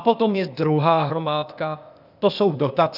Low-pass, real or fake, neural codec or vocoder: 5.4 kHz; fake; codec, 16 kHz, 0.8 kbps, ZipCodec